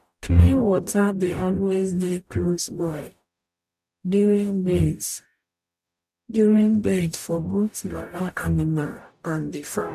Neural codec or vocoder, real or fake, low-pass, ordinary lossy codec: codec, 44.1 kHz, 0.9 kbps, DAC; fake; 14.4 kHz; none